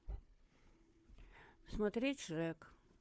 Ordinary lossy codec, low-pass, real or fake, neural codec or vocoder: none; none; fake; codec, 16 kHz, 4 kbps, FunCodec, trained on Chinese and English, 50 frames a second